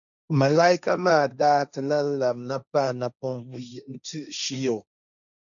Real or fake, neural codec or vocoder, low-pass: fake; codec, 16 kHz, 1.1 kbps, Voila-Tokenizer; 7.2 kHz